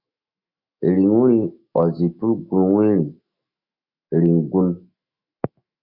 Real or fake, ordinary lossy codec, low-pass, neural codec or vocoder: fake; Opus, 64 kbps; 5.4 kHz; autoencoder, 48 kHz, 128 numbers a frame, DAC-VAE, trained on Japanese speech